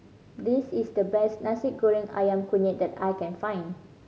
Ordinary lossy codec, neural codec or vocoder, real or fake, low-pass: none; none; real; none